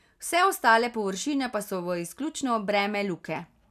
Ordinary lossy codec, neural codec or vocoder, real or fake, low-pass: none; none; real; 14.4 kHz